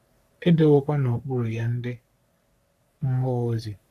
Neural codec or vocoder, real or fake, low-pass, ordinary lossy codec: codec, 44.1 kHz, 3.4 kbps, Pupu-Codec; fake; 14.4 kHz; AAC, 64 kbps